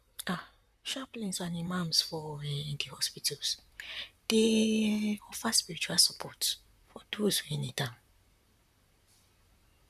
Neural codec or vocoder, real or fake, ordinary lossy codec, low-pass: vocoder, 44.1 kHz, 128 mel bands, Pupu-Vocoder; fake; none; 14.4 kHz